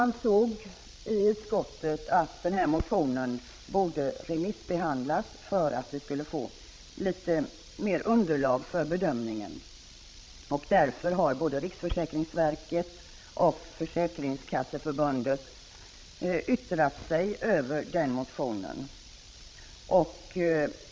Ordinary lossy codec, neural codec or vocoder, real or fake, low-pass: none; codec, 16 kHz, 16 kbps, FreqCodec, larger model; fake; none